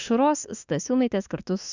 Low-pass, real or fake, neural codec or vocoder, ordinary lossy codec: 7.2 kHz; fake; autoencoder, 48 kHz, 32 numbers a frame, DAC-VAE, trained on Japanese speech; Opus, 64 kbps